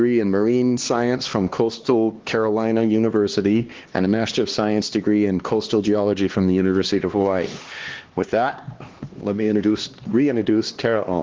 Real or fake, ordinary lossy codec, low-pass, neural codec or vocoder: fake; Opus, 16 kbps; 7.2 kHz; codec, 16 kHz, 2 kbps, X-Codec, HuBERT features, trained on LibriSpeech